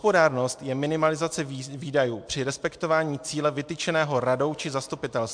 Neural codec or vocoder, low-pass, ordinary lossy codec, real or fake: none; 9.9 kHz; MP3, 64 kbps; real